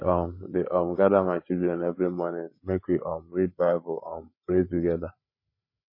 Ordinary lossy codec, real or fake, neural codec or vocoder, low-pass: MP3, 24 kbps; fake; codec, 16 kHz, 8 kbps, FreqCodec, larger model; 5.4 kHz